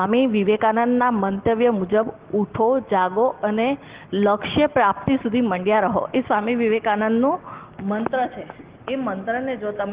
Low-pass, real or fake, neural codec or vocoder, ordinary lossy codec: 3.6 kHz; real; none; Opus, 16 kbps